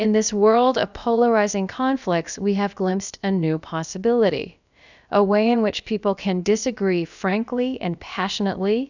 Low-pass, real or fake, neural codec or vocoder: 7.2 kHz; fake; codec, 16 kHz, about 1 kbps, DyCAST, with the encoder's durations